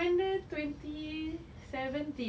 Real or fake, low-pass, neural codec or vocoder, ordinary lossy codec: real; none; none; none